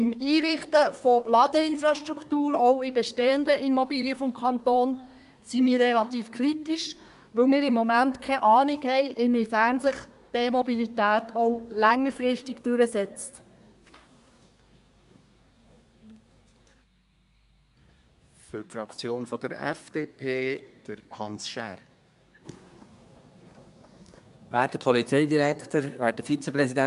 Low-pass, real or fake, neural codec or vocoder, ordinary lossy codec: 10.8 kHz; fake; codec, 24 kHz, 1 kbps, SNAC; AAC, 96 kbps